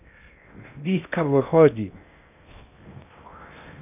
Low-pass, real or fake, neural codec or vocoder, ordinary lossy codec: 3.6 kHz; fake; codec, 16 kHz in and 24 kHz out, 0.6 kbps, FocalCodec, streaming, 2048 codes; none